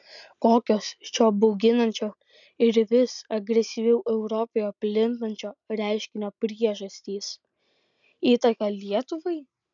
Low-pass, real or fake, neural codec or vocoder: 7.2 kHz; real; none